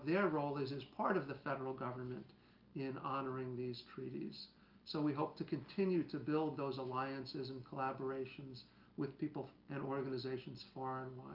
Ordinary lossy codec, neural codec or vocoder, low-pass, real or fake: Opus, 24 kbps; none; 5.4 kHz; real